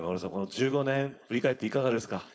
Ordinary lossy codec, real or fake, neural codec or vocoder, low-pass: none; fake; codec, 16 kHz, 4.8 kbps, FACodec; none